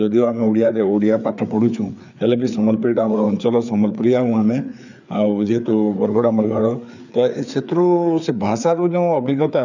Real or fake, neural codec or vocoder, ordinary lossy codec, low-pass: fake; codec, 16 kHz, 4 kbps, FreqCodec, larger model; none; 7.2 kHz